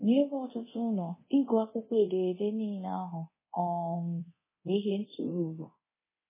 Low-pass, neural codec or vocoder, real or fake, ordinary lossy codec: 3.6 kHz; codec, 24 kHz, 0.9 kbps, DualCodec; fake; MP3, 16 kbps